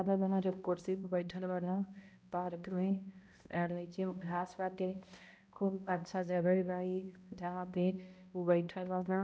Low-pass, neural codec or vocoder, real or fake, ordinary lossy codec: none; codec, 16 kHz, 0.5 kbps, X-Codec, HuBERT features, trained on balanced general audio; fake; none